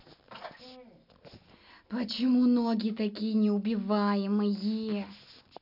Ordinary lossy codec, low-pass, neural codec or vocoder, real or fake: none; 5.4 kHz; none; real